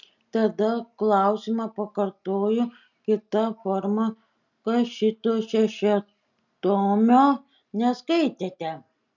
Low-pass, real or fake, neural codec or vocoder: 7.2 kHz; real; none